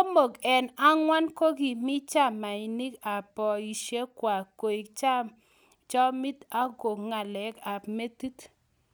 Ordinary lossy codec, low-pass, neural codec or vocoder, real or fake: none; none; none; real